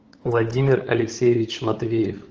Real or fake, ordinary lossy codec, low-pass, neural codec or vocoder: fake; Opus, 16 kbps; 7.2 kHz; codec, 16 kHz, 8 kbps, FunCodec, trained on LibriTTS, 25 frames a second